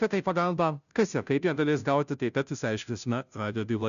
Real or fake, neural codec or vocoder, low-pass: fake; codec, 16 kHz, 0.5 kbps, FunCodec, trained on Chinese and English, 25 frames a second; 7.2 kHz